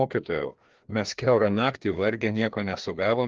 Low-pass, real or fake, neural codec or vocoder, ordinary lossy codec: 7.2 kHz; fake; codec, 16 kHz, 2 kbps, FreqCodec, larger model; Opus, 16 kbps